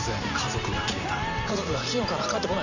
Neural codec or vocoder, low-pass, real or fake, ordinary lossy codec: vocoder, 44.1 kHz, 128 mel bands every 512 samples, BigVGAN v2; 7.2 kHz; fake; none